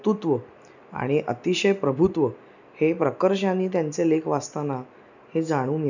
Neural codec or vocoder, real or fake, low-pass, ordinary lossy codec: none; real; 7.2 kHz; none